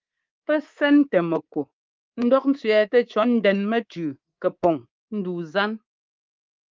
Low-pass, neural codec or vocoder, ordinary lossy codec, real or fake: 7.2 kHz; codec, 24 kHz, 3.1 kbps, DualCodec; Opus, 32 kbps; fake